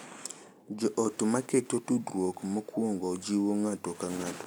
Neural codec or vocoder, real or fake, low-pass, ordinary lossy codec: none; real; none; none